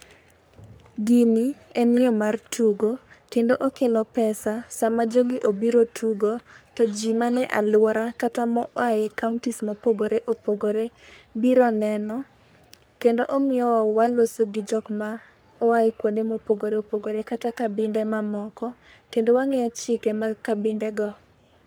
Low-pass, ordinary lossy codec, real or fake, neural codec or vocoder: none; none; fake; codec, 44.1 kHz, 3.4 kbps, Pupu-Codec